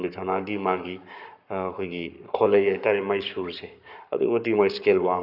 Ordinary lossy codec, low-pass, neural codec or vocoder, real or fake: none; 5.4 kHz; codec, 44.1 kHz, 7.8 kbps, Pupu-Codec; fake